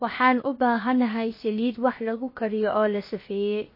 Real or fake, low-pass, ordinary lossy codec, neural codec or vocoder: fake; 5.4 kHz; MP3, 24 kbps; codec, 16 kHz, 0.7 kbps, FocalCodec